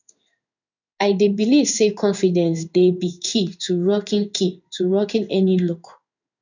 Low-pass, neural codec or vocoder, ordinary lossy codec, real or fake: 7.2 kHz; codec, 16 kHz in and 24 kHz out, 1 kbps, XY-Tokenizer; none; fake